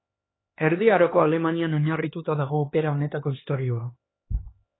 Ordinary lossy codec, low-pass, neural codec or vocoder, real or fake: AAC, 16 kbps; 7.2 kHz; codec, 16 kHz, 2 kbps, X-Codec, HuBERT features, trained on LibriSpeech; fake